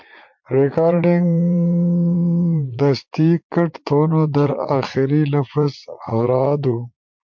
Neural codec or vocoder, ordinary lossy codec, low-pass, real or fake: vocoder, 44.1 kHz, 80 mel bands, Vocos; MP3, 48 kbps; 7.2 kHz; fake